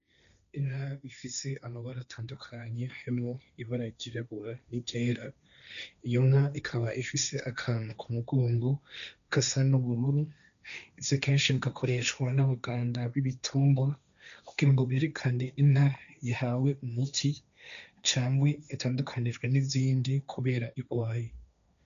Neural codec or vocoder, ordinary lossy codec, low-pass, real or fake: codec, 16 kHz, 1.1 kbps, Voila-Tokenizer; MP3, 96 kbps; 7.2 kHz; fake